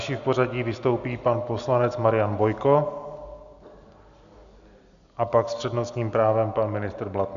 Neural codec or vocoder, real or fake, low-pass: none; real; 7.2 kHz